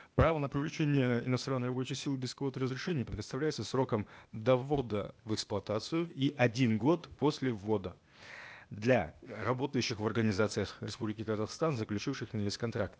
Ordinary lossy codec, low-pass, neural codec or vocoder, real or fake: none; none; codec, 16 kHz, 0.8 kbps, ZipCodec; fake